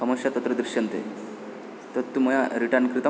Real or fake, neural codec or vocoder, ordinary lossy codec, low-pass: real; none; none; none